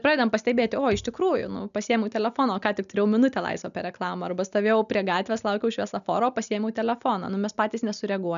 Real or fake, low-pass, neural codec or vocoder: real; 7.2 kHz; none